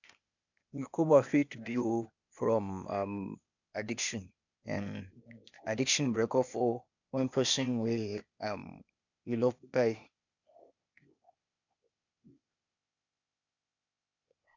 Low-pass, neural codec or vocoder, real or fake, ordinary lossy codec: 7.2 kHz; codec, 16 kHz, 0.8 kbps, ZipCodec; fake; none